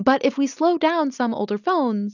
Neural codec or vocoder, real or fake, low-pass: none; real; 7.2 kHz